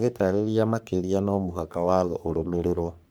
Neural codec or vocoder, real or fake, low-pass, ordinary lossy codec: codec, 44.1 kHz, 3.4 kbps, Pupu-Codec; fake; none; none